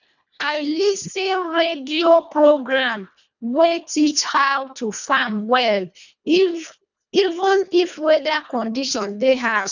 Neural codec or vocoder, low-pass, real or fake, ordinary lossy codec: codec, 24 kHz, 1.5 kbps, HILCodec; 7.2 kHz; fake; none